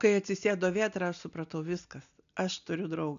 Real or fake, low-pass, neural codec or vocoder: real; 7.2 kHz; none